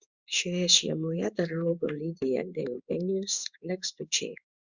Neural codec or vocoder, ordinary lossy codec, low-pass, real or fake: codec, 16 kHz in and 24 kHz out, 1 kbps, XY-Tokenizer; Opus, 64 kbps; 7.2 kHz; fake